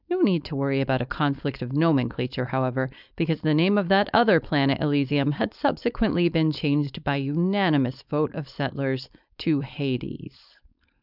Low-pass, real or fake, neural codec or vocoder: 5.4 kHz; fake; codec, 16 kHz, 4.8 kbps, FACodec